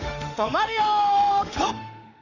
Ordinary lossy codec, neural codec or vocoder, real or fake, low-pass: none; autoencoder, 48 kHz, 32 numbers a frame, DAC-VAE, trained on Japanese speech; fake; 7.2 kHz